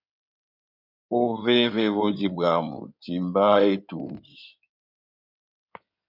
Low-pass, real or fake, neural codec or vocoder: 5.4 kHz; fake; codec, 16 kHz in and 24 kHz out, 2.2 kbps, FireRedTTS-2 codec